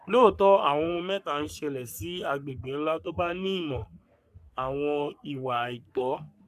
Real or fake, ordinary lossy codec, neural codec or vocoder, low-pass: fake; none; codec, 44.1 kHz, 3.4 kbps, Pupu-Codec; 14.4 kHz